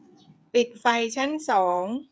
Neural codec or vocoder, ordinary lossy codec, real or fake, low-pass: codec, 16 kHz, 8 kbps, FreqCodec, smaller model; none; fake; none